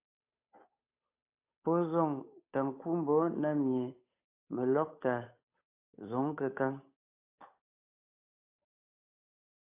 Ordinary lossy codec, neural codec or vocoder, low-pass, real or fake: AAC, 32 kbps; codec, 16 kHz, 8 kbps, FunCodec, trained on Chinese and English, 25 frames a second; 3.6 kHz; fake